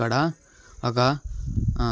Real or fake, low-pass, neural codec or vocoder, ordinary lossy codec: real; none; none; none